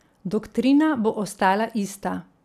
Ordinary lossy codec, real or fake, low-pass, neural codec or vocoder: none; real; 14.4 kHz; none